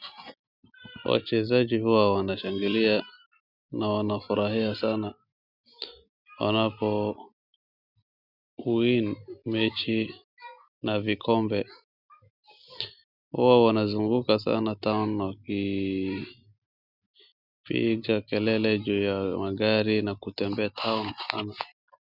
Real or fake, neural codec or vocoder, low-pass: real; none; 5.4 kHz